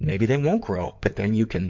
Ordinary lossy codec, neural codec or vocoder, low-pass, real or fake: MP3, 48 kbps; codec, 16 kHz in and 24 kHz out, 2.2 kbps, FireRedTTS-2 codec; 7.2 kHz; fake